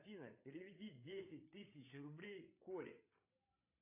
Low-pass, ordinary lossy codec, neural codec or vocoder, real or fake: 3.6 kHz; AAC, 32 kbps; codec, 16 kHz, 8 kbps, FreqCodec, larger model; fake